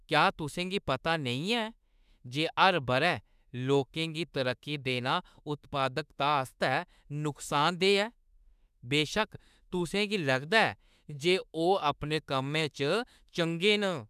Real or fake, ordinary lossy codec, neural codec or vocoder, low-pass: fake; none; autoencoder, 48 kHz, 32 numbers a frame, DAC-VAE, trained on Japanese speech; 14.4 kHz